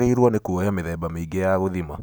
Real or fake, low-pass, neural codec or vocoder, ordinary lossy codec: real; none; none; none